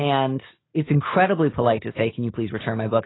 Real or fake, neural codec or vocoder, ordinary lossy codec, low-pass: real; none; AAC, 16 kbps; 7.2 kHz